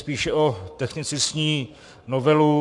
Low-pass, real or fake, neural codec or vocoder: 10.8 kHz; fake; codec, 44.1 kHz, 7.8 kbps, Pupu-Codec